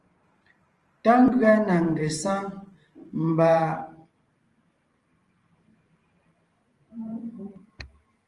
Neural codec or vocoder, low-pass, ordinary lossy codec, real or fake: vocoder, 44.1 kHz, 128 mel bands every 512 samples, BigVGAN v2; 10.8 kHz; Opus, 24 kbps; fake